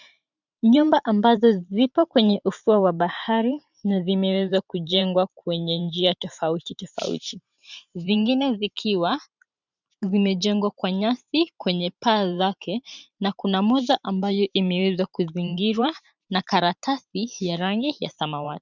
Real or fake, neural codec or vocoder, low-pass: fake; vocoder, 44.1 kHz, 128 mel bands every 512 samples, BigVGAN v2; 7.2 kHz